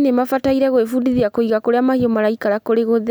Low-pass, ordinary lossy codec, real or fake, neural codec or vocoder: none; none; real; none